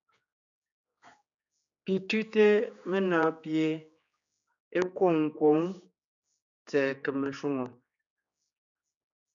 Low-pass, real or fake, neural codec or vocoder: 7.2 kHz; fake; codec, 16 kHz, 4 kbps, X-Codec, HuBERT features, trained on general audio